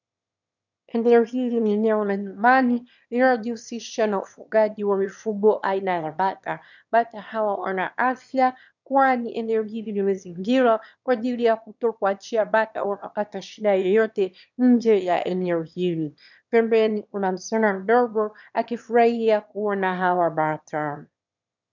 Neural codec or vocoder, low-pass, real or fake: autoencoder, 22.05 kHz, a latent of 192 numbers a frame, VITS, trained on one speaker; 7.2 kHz; fake